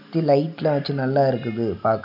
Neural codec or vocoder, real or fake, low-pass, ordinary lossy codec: none; real; 5.4 kHz; none